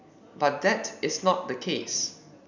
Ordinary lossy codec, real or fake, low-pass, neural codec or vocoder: none; real; 7.2 kHz; none